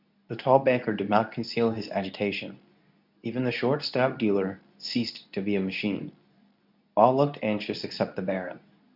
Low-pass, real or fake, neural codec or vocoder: 5.4 kHz; fake; codec, 24 kHz, 0.9 kbps, WavTokenizer, medium speech release version 2